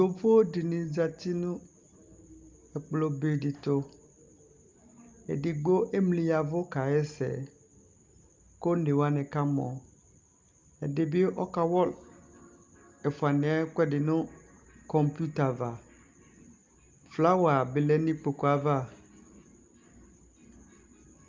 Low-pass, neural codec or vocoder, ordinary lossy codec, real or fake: 7.2 kHz; none; Opus, 32 kbps; real